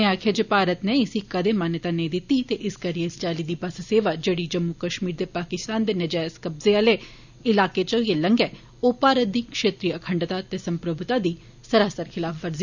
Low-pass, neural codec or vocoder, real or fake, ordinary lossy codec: 7.2 kHz; none; real; none